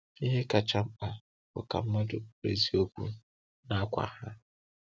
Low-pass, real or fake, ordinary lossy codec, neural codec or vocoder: none; real; none; none